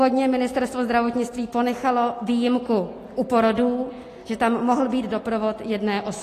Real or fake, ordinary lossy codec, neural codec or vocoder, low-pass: real; AAC, 48 kbps; none; 14.4 kHz